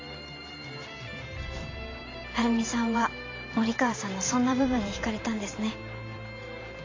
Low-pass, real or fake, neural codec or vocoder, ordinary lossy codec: 7.2 kHz; fake; vocoder, 44.1 kHz, 128 mel bands every 512 samples, BigVGAN v2; AAC, 32 kbps